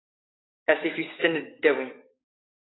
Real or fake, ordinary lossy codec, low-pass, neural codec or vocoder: fake; AAC, 16 kbps; 7.2 kHz; codec, 16 kHz, 6 kbps, DAC